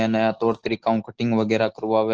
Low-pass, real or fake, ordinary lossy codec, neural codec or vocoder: 7.2 kHz; real; Opus, 16 kbps; none